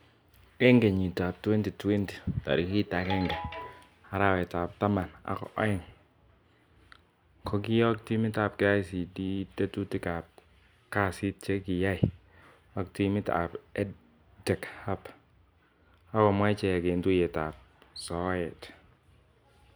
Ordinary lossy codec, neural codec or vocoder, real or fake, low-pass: none; none; real; none